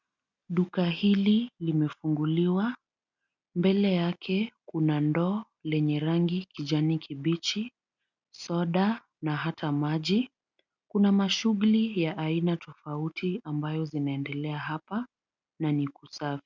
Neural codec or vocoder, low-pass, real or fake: none; 7.2 kHz; real